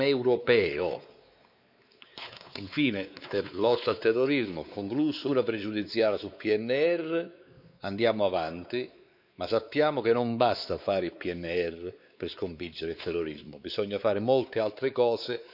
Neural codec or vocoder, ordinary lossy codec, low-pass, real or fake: codec, 16 kHz, 4 kbps, X-Codec, WavLM features, trained on Multilingual LibriSpeech; none; 5.4 kHz; fake